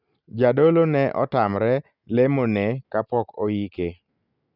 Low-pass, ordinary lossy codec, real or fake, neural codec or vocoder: 5.4 kHz; none; real; none